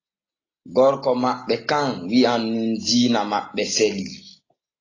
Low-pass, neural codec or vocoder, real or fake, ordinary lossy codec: 7.2 kHz; none; real; AAC, 32 kbps